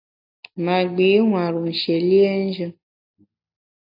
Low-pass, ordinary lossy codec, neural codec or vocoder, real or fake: 5.4 kHz; AAC, 48 kbps; none; real